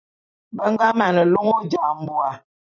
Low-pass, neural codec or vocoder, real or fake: 7.2 kHz; vocoder, 44.1 kHz, 128 mel bands every 256 samples, BigVGAN v2; fake